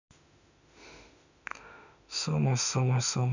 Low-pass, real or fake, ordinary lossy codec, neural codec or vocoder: 7.2 kHz; fake; none; autoencoder, 48 kHz, 32 numbers a frame, DAC-VAE, trained on Japanese speech